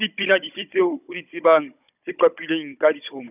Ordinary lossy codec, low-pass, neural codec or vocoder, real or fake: none; 3.6 kHz; codec, 16 kHz, 16 kbps, FunCodec, trained on Chinese and English, 50 frames a second; fake